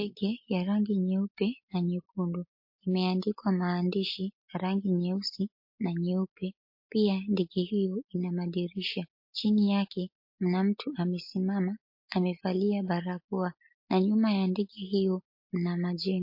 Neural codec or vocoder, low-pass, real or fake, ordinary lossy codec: none; 5.4 kHz; real; MP3, 32 kbps